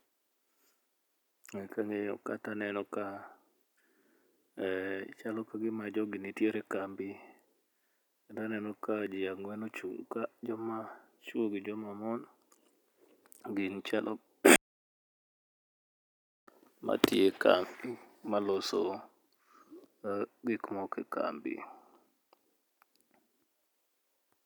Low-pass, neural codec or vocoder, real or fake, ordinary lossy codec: none; none; real; none